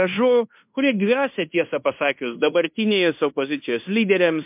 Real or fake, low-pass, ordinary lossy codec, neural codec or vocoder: fake; 3.6 kHz; MP3, 32 kbps; codec, 16 kHz in and 24 kHz out, 0.9 kbps, LongCat-Audio-Codec, fine tuned four codebook decoder